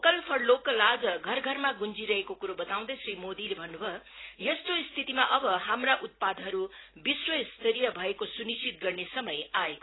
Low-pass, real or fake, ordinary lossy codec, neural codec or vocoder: 7.2 kHz; real; AAC, 16 kbps; none